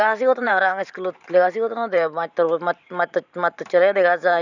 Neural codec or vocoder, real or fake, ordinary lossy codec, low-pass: vocoder, 44.1 kHz, 128 mel bands every 512 samples, BigVGAN v2; fake; none; 7.2 kHz